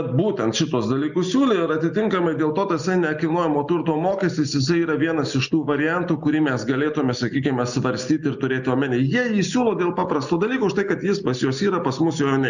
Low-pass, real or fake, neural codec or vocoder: 7.2 kHz; real; none